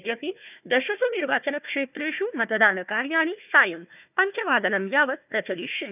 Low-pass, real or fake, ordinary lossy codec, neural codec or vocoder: 3.6 kHz; fake; none; codec, 16 kHz, 1 kbps, FunCodec, trained on Chinese and English, 50 frames a second